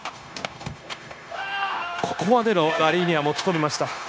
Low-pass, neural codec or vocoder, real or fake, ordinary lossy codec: none; codec, 16 kHz, 0.9 kbps, LongCat-Audio-Codec; fake; none